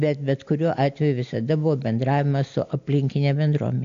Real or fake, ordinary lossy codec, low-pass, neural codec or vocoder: real; AAC, 64 kbps; 7.2 kHz; none